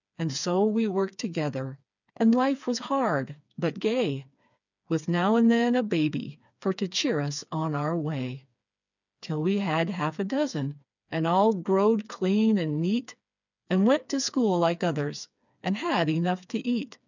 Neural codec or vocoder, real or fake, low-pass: codec, 16 kHz, 4 kbps, FreqCodec, smaller model; fake; 7.2 kHz